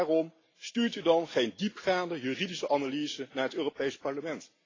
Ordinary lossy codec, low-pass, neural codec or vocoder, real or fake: AAC, 32 kbps; 7.2 kHz; none; real